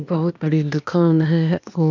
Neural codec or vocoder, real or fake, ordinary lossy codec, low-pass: codec, 16 kHz, 0.8 kbps, ZipCodec; fake; none; 7.2 kHz